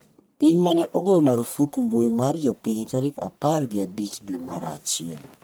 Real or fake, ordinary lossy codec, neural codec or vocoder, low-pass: fake; none; codec, 44.1 kHz, 1.7 kbps, Pupu-Codec; none